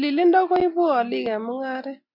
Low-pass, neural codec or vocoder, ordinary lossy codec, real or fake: 5.4 kHz; none; MP3, 48 kbps; real